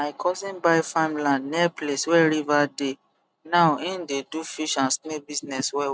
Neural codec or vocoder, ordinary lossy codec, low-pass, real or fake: none; none; none; real